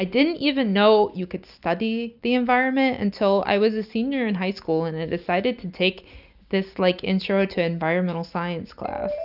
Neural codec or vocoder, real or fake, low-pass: none; real; 5.4 kHz